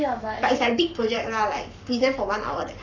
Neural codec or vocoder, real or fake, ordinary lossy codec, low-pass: codec, 44.1 kHz, 7.8 kbps, Pupu-Codec; fake; none; 7.2 kHz